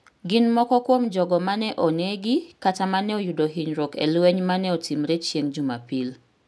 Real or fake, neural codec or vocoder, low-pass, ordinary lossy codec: real; none; none; none